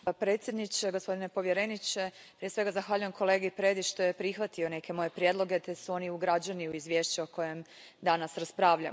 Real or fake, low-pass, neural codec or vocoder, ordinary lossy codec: real; none; none; none